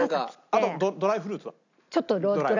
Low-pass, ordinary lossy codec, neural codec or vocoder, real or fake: 7.2 kHz; none; none; real